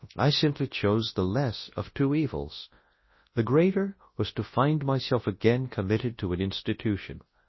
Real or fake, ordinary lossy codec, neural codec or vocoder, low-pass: fake; MP3, 24 kbps; codec, 24 kHz, 0.9 kbps, WavTokenizer, large speech release; 7.2 kHz